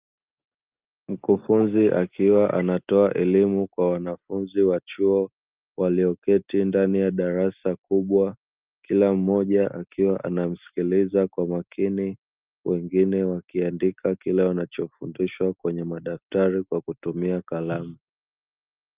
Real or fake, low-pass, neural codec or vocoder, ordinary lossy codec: real; 3.6 kHz; none; Opus, 32 kbps